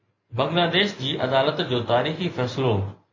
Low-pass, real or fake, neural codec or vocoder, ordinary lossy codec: 7.2 kHz; real; none; MP3, 32 kbps